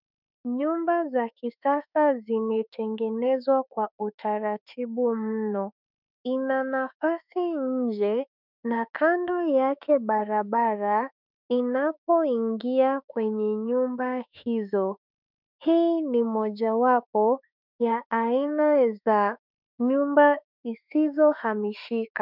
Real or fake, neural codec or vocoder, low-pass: fake; autoencoder, 48 kHz, 32 numbers a frame, DAC-VAE, trained on Japanese speech; 5.4 kHz